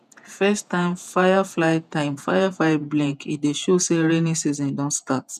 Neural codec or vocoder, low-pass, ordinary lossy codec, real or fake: vocoder, 48 kHz, 128 mel bands, Vocos; 14.4 kHz; none; fake